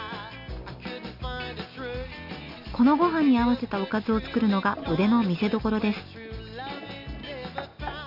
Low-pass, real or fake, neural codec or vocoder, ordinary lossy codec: 5.4 kHz; real; none; none